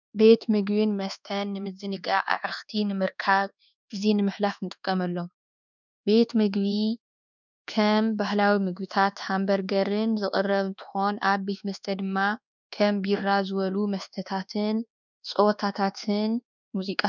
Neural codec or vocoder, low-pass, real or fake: codec, 24 kHz, 1.2 kbps, DualCodec; 7.2 kHz; fake